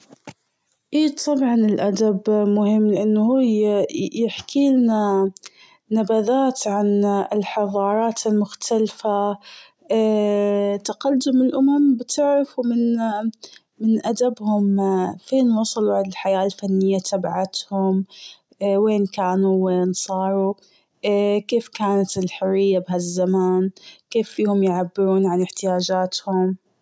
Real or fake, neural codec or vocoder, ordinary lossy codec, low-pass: real; none; none; none